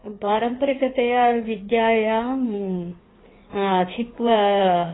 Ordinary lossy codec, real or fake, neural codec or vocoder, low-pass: AAC, 16 kbps; fake; codec, 16 kHz in and 24 kHz out, 1.1 kbps, FireRedTTS-2 codec; 7.2 kHz